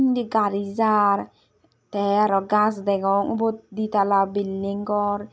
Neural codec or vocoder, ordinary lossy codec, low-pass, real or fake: none; none; none; real